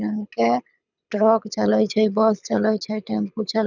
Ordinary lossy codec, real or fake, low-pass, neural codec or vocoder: none; fake; 7.2 kHz; codec, 24 kHz, 6 kbps, HILCodec